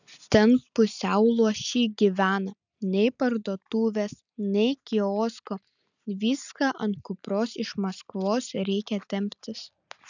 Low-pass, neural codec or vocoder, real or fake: 7.2 kHz; none; real